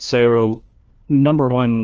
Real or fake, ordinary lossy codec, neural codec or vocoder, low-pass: fake; Opus, 24 kbps; codec, 16 kHz, 1 kbps, FunCodec, trained on LibriTTS, 50 frames a second; 7.2 kHz